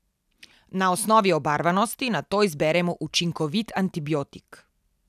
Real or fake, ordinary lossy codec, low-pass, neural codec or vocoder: real; none; 14.4 kHz; none